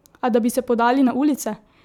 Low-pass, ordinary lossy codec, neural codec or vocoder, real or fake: 19.8 kHz; none; vocoder, 44.1 kHz, 128 mel bands every 256 samples, BigVGAN v2; fake